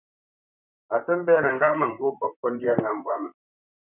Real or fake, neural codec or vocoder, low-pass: fake; vocoder, 44.1 kHz, 128 mel bands, Pupu-Vocoder; 3.6 kHz